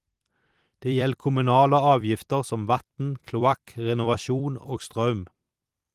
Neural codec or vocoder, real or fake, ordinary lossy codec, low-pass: vocoder, 44.1 kHz, 128 mel bands every 256 samples, BigVGAN v2; fake; Opus, 24 kbps; 14.4 kHz